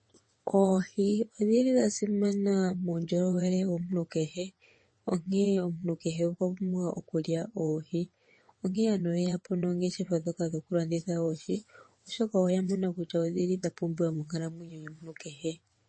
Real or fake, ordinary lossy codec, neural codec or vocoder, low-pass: fake; MP3, 32 kbps; vocoder, 22.05 kHz, 80 mel bands, WaveNeXt; 9.9 kHz